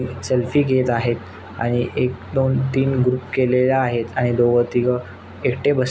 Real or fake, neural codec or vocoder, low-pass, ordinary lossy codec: real; none; none; none